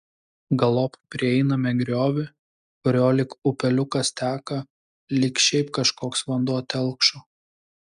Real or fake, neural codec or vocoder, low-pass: real; none; 10.8 kHz